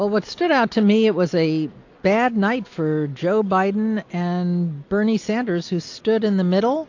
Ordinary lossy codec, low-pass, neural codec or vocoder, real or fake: AAC, 48 kbps; 7.2 kHz; none; real